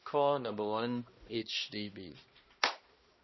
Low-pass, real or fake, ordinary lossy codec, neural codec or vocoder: 7.2 kHz; fake; MP3, 24 kbps; codec, 16 kHz, 1 kbps, X-Codec, HuBERT features, trained on balanced general audio